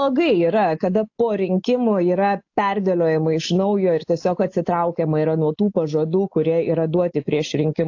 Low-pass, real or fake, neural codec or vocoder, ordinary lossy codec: 7.2 kHz; real; none; AAC, 48 kbps